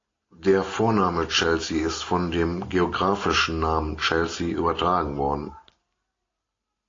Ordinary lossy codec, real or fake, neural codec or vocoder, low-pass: AAC, 32 kbps; real; none; 7.2 kHz